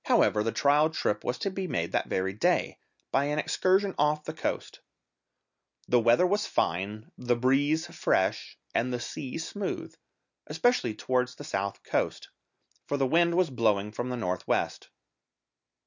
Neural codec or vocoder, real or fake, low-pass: none; real; 7.2 kHz